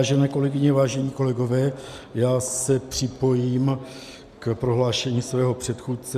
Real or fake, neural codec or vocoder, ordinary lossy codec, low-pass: real; none; MP3, 96 kbps; 14.4 kHz